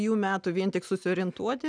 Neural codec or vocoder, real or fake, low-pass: none; real; 9.9 kHz